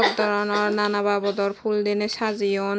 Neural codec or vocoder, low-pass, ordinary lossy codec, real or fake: none; none; none; real